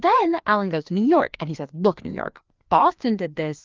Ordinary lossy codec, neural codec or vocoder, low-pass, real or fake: Opus, 32 kbps; codec, 16 kHz, 2 kbps, FreqCodec, larger model; 7.2 kHz; fake